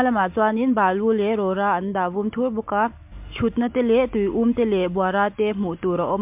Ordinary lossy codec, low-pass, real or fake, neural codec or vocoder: MP3, 32 kbps; 3.6 kHz; real; none